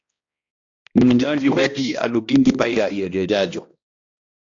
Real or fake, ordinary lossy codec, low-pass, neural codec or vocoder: fake; AAC, 48 kbps; 7.2 kHz; codec, 16 kHz, 1 kbps, X-Codec, HuBERT features, trained on balanced general audio